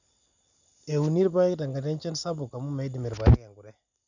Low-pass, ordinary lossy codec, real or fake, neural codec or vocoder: 7.2 kHz; none; real; none